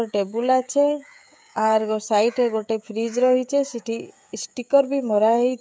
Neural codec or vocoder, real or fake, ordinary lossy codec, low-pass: codec, 16 kHz, 16 kbps, FreqCodec, smaller model; fake; none; none